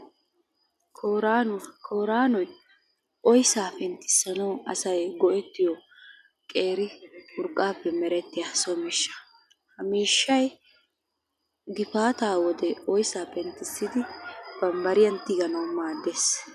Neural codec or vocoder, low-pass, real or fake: none; 14.4 kHz; real